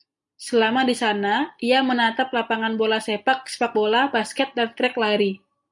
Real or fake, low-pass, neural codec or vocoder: real; 10.8 kHz; none